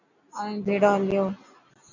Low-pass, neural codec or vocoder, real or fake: 7.2 kHz; none; real